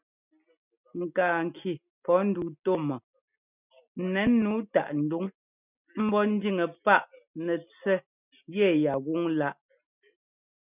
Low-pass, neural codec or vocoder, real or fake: 3.6 kHz; none; real